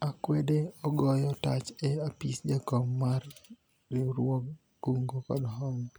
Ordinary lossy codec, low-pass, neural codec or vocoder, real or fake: none; none; none; real